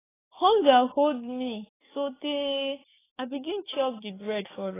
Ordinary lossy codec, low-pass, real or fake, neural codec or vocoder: AAC, 16 kbps; 3.6 kHz; fake; codec, 44.1 kHz, 7.8 kbps, DAC